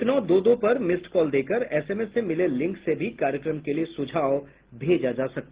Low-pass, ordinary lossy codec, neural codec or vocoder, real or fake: 3.6 kHz; Opus, 16 kbps; none; real